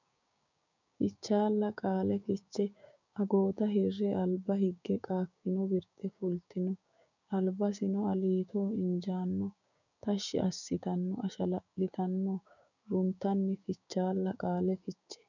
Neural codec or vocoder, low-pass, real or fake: codec, 16 kHz, 6 kbps, DAC; 7.2 kHz; fake